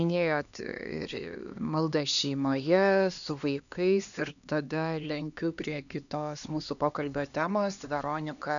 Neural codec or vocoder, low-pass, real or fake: codec, 16 kHz, 2 kbps, X-Codec, HuBERT features, trained on LibriSpeech; 7.2 kHz; fake